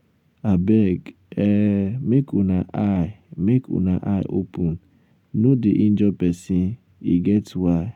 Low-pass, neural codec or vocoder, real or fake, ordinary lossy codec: 19.8 kHz; none; real; none